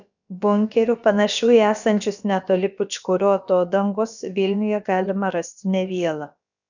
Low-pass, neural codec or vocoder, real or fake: 7.2 kHz; codec, 16 kHz, about 1 kbps, DyCAST, with the encoder's durations; fake